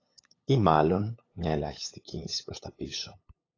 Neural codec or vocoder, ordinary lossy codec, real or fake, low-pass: codec, 16 kHz, 8 kbps, FunCodec, trained on LibriTTS, 25 frames a second; AAC, 32 kbps; fake; 7.2 kHz